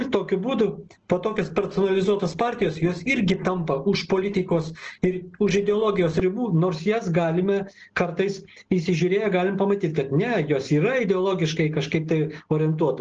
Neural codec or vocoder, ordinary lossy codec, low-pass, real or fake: none; Opus, 16 kbps; 7.2 kHz; real